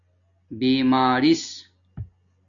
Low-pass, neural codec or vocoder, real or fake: 7.2 kHz; none; real